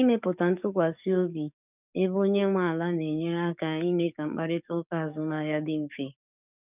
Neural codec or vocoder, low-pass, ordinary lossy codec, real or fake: codec, 44.1 kHz, 7.8 kbps, DAC; 3.6 kHz; none; fake